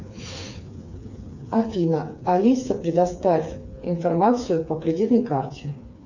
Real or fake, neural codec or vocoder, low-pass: fake; codec, 16 kHz, 4 kbps, FreqCodec, smaller model; 7.2 kHz